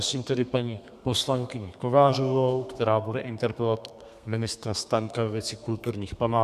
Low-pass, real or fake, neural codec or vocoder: 14.4 kHz; fake; codec, 32 kHz, 1.9 kbps, SNAC